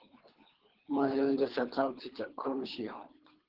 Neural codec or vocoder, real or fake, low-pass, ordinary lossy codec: codec, 24 kHz, 3 kbps, HILCodec; fake; 5.4 kHz; Opus, 16 kbps